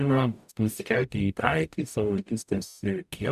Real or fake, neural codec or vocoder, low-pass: fake; codec, 44.1 kHz, 0.9 kbps, DAC; 14.4 kHz